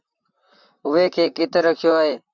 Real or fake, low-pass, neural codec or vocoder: fake; 7.2 kHz; vocoder, 44.1 kHz, 128 mel bands, Pupu-Vocoder